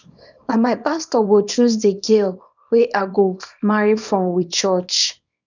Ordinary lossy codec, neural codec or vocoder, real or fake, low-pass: none; codec, 24 kHz, 0.9 kbps, WavTokenizer, small release; fake; 7.2 kHz